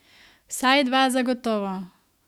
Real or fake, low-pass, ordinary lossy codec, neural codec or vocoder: fake; 19.8 kHz; Opus, 64 kbps; autoencoder, 48 kHz, 128 numbers a frame, DAC-VAE, trained on Japanese speech